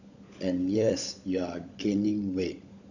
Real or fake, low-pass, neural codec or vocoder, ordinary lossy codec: fake; 7.2 kHz; codec, 16 kHz, 16 kbps, FunCodec, trained on LibriTTS, 50 frames a second; none